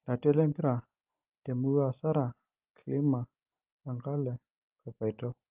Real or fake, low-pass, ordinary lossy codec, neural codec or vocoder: real; 3.6 kHz; Opus, 32 kbps; none